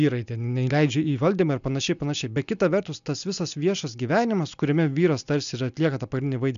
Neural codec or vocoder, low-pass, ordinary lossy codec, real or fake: none; 7.2 kHz; MP3, 96 kbps; real